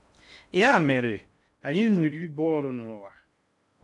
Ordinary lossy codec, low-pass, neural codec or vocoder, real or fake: none; 10.8 kHz; codec, 16 kHz in and 24 kHz out, 0.6 kbps, FocalCodec, streaming, 2048 codes; fake